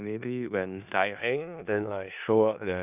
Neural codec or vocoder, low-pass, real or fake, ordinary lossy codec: codec, 16 kHz in and 24 kHz out, 0.4 kbps, LongCat-Audio-Codec, four codebook decoder; 3.6 kHz; fake; none